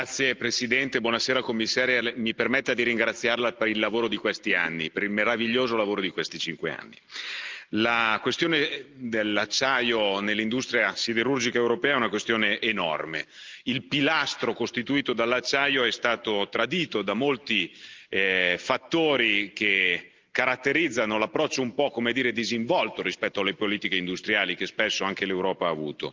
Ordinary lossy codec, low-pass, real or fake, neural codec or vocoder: Opus, 32 kbps; 7.2 kHz; real; none